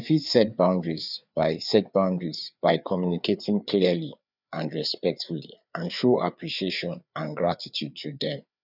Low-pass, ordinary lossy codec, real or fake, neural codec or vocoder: 5.4 kHz; none; fake; codec, 16 kHz, 4 kbps, FreqCodec, larger model